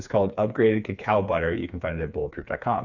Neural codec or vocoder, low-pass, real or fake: codec, 16 kHz, 4 kbps, FreqCodec, smaller model; 7.2 kHz; fake